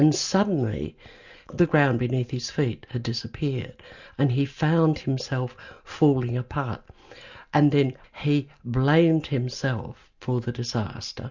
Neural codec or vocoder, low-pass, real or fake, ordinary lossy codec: none; 7.2 kHz; real; Opus, 64 kbps